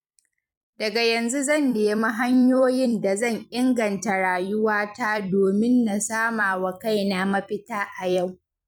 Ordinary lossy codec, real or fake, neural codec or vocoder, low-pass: none; fake; vocoder, 44.1 kHz, 128 mel bands every 256 samples, BigVGAN v2; 19.8 kHz